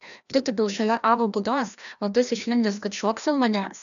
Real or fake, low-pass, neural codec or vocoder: fake; 7.2 kHz; codec, 16 kHz, 1 kbps, FreqCodec, larger model